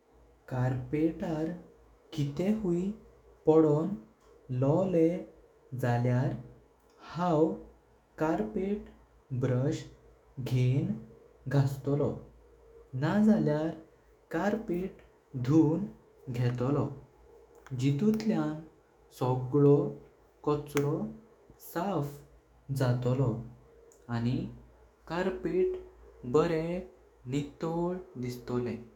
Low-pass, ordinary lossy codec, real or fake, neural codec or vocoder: 19.8 kHz; none; fake; autoencoder, 48 kHz, 128 numbers a frame, DAC-VAE, trained on Japanese speech